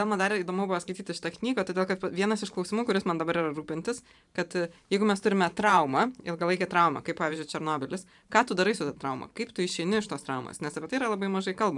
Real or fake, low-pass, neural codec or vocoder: fake; 10.8 kHz; vocoder, 44.1 kHz, 128 mel bands every 512 samples, BigVGAN v2